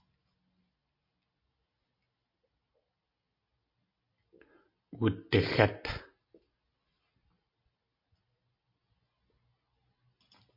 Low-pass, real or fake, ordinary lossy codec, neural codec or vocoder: 5.4 kHz; real; MP3, 32 kbps; none